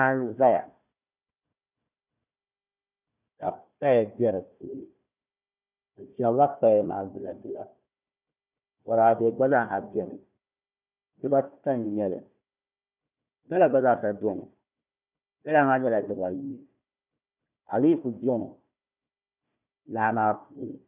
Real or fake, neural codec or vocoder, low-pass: fake; codec, 16 kHz, 1 kbps, FunCodec, trained on Chinese and English, 50 frames a second; 3.6 kHz